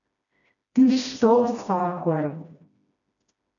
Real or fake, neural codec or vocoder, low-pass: fake; codec, 16 kHz, 1 kbps, FreqCodec, smaller model; 7.2 kHz